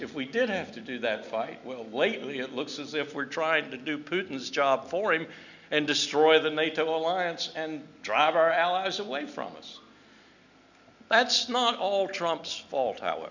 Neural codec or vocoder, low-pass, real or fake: none; 7.2 kHz; real